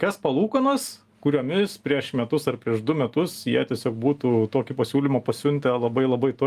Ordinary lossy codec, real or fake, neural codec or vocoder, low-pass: Opus, 32 kbps; real; none; 14.4 kHz